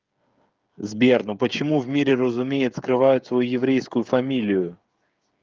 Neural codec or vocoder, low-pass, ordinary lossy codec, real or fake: codec, 16 kHz, 8 kbps, FreqCodec, smaller model; 7.2 kHz; Opus, 24 kbps; fake